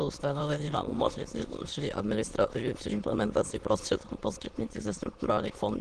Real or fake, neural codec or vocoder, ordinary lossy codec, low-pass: fake; autoencoder, 22.05 kHz, a latent of 192 numbers a frame, VITS, trained on many speakers; Opus, 16 kbps; 9.9 kHz